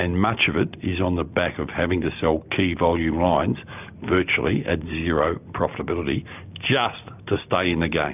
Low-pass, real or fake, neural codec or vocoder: 3.6 kHz; real; none